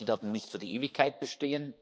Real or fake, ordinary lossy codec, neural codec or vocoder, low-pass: fake; none; codec, 16 kHz, 2 kbps, X-Codec, HuBERT features, trained on balanced general audio; none